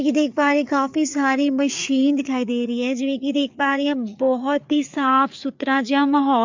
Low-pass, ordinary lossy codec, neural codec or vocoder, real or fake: 7.2 kHz; MP3, 64 kbps; codec, 16 kHz, 4 kbps, FreqCodec, larger model; fake